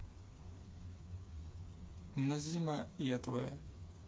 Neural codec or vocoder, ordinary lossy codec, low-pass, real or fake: codec, 16 kHz, 4 kbps, FreqCodec, smaller model; none; none; fake